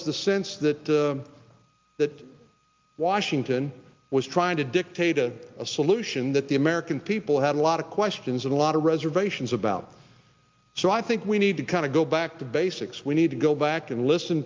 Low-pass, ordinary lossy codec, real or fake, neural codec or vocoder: 7.2 kHz; Opus, 24 kbps; real; none